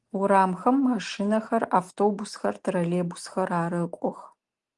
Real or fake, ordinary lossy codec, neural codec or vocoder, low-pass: real; Opus, 24 kbps; none; 10.8 kHz